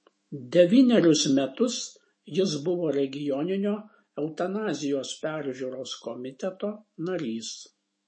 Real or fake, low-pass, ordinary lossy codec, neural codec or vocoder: fake; 9.9 kHz; MP3, 32 kbps; autoencoder, 48 kHz, 128 numbers a frame, DAC-VAE, trained on Japanese speech